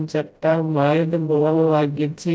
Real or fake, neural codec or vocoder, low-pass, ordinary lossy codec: fake; codec, 16 kHz, 0.5 kbps, FreqCodec, smaller model; none; none